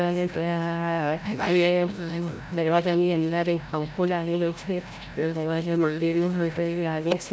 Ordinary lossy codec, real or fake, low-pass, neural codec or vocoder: none; fake; none; codec, 16 kHz, 0.5 kbps, FreqCodec, larger model